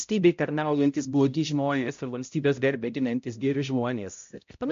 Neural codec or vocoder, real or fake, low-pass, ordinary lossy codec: codec, 16 kHz, 0.5 kbps, X-Codec, HuBERT features, trained on balanced general audio; fake; 7.2 kHz; MP3, 48 kbps